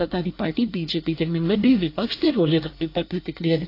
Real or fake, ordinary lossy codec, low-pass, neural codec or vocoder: fake; AAC, 32 kbps; 5.4 kHz; codec, 24 kHz, 1 kbps, SNAC